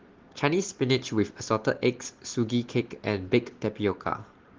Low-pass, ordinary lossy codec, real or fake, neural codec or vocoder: 7.2 kHz; Opus, 16 kbps; real; none